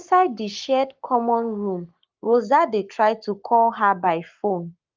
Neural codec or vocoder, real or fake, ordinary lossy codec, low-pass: codec, 44.1 kHz, 7.8 kbps, Pupu-Codec; fake; Opus, 32 kbps; 7.2 kHz